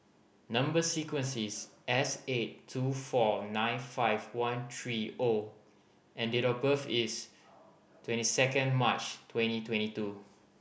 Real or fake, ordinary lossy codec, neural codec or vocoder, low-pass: real; none; none; none